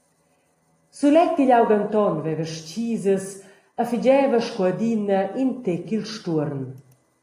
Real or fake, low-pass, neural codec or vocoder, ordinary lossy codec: real; 14.4 kHz; none; AAC, 48 kbps